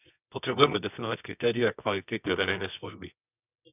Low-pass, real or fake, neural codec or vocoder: 3.6 kHz; fake; codec, 24 kHz, 0.9 kbps, WavTokenizer, medium music audio release